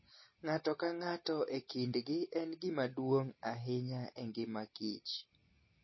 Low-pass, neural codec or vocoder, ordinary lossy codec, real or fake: 7.2 kHz; none; MP3, 24 kbps; real